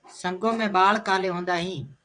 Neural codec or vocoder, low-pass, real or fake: vocoder, 22.05 kHz, 80 mel bands, WaveNeXt; 9.9 kHz; fake